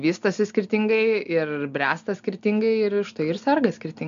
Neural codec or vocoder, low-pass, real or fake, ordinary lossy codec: none; 7.2 kHz; real; MP3, 64 kbps